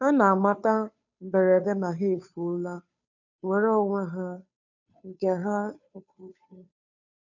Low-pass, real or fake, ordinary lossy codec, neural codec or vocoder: 7.2 kHz; fake; none; codec, 16 kHz, 2 kbps, FunCodec, trained on Chinese and English, 25 frames a second